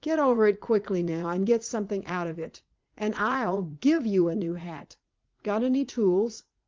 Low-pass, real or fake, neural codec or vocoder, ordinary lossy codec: 7.2 kHz; fake; vocoder, 44.1 kHz, 80 mel bands, Vocos; Opus, 32 kbps